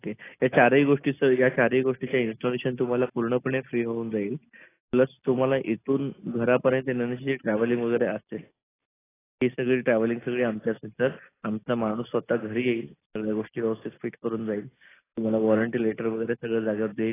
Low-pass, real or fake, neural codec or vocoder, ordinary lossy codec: 3.6 kHz; real; none; AAC, 16 kbps